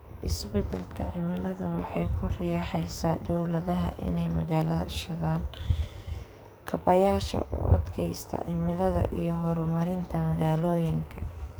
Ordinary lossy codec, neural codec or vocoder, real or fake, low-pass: none; codec, 44.1 kHz, 2.6 kbps, SNAC; fake; none